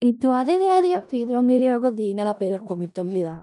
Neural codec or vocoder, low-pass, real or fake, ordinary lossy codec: codec, 16 kHz in and 24 kHz out, 0.4 kbps, LongCat-Audio-Codec, four codebook decoder; 10.8 kHz; fake; MP3, 96 kbps